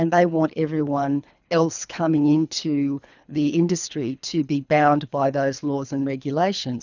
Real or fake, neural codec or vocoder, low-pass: fake; codec, 24 kHz, 3 kbps, HILCodec; 7.2 kHz